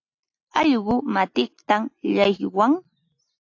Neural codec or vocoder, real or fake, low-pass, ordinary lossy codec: none; real; 7.2 kHz; AAC, 48 kbps